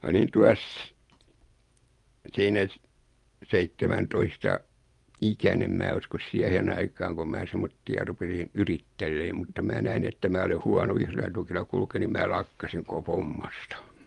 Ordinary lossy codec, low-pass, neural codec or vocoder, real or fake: Opus, 32 kbps; 10.8 kHz; vocoder, 24 kHz, 100 mel bands, Vocos; fake